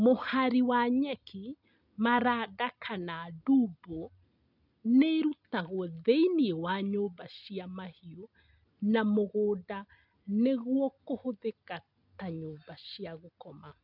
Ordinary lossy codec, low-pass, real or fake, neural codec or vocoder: none; 5.4 kHz; real; none